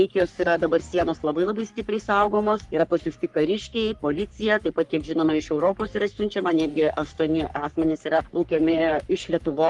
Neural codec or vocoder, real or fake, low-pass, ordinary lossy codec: codec, 44.1 kHz, 3.4 kbps, Pupu-Codec; fake; 10.8 kHz; Opus, 32 kbps